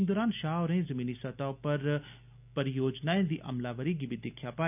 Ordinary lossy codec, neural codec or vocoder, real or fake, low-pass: none; none; real; 3.6 kHz